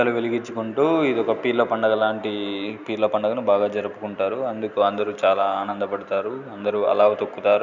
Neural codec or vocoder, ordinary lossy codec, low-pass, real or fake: none; none; 7.2 kHz; real